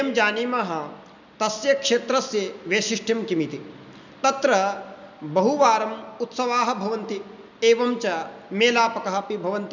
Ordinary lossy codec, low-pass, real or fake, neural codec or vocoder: none; 7.2 kHz; real; none